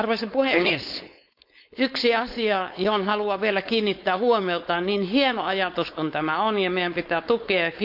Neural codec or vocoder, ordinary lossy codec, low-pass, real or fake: codec, 16 kHz, 4.8 kbps, FACodec; none; 5.4 kHz; fake